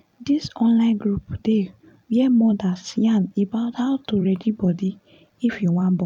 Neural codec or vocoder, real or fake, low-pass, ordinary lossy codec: vocoder, 44.1 kHz, 128 mel bands every 256 samples, BigVGAN v2; fake; 19.8 kHz; none